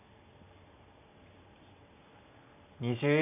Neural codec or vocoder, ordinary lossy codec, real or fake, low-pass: none; none; real; 3.6 kHz